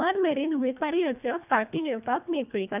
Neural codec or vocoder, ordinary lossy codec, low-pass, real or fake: codec, 24 kHz, 1.5 kbps, HILCodec; none; 3.6 kHz; fake